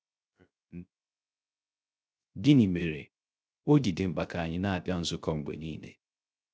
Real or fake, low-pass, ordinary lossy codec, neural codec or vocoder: fake; none; none; codec, 16 kHz, 0.3 kbps, FocalCodec